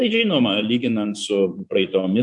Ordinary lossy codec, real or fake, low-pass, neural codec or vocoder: MP3, 64 kbps; fake; 10.8 kHz; vocoder, 24 kHz, 100 mel bands, Vocos